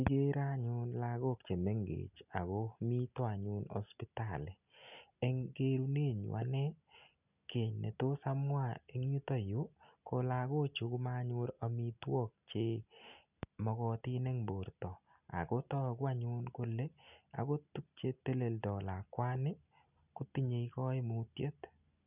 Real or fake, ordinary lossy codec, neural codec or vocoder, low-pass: real; none; none; 3.6 kHz